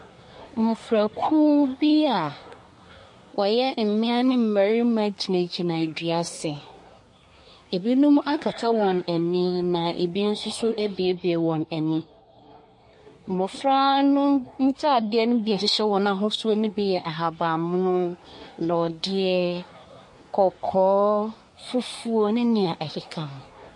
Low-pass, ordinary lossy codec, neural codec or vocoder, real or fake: 10.8 kHz; MP3, 48 kbps; codec, 24 kHz, 1 kbps, SNAC; fake